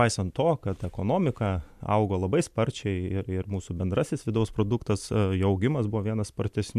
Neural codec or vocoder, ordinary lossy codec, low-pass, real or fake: none; MP3, 96 kbps; 14.4 kHz; real